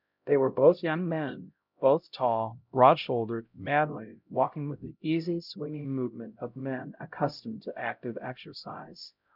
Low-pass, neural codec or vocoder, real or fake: 5.4 kHz; codec, 16 kHz, 0.5 kbps, X-Codec, HuBERT features, trained on LibriSpeech; fake